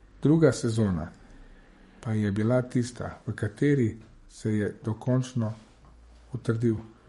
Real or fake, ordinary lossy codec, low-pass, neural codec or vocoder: fake; MP3, 48 kbps; 19.8 kHz; codec, 44.1 kHz, 7.8 kbps, DAC